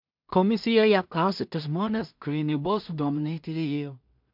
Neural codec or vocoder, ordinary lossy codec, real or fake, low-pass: codec, 16 kHz in and 24 kHz out, 0.4 kbps, LongCat-Audio-Codec, two codebook decoder; MP3, 48 kbps; fake; 5.4 kHz